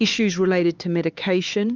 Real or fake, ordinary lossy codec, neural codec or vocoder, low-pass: fake; Opus, 32 kbps; codec, 16 kHz, 4 kbps, X-Codec, HuBERT features, trained on LibriSpeech; 7.2 kHz